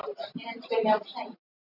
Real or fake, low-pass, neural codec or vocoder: real; 5.4 kHz; none